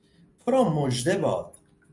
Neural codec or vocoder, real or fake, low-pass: none; real; 10.8 kHz